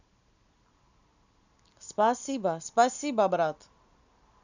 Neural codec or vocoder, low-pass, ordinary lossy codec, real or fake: none; 7.2 kHz; none; real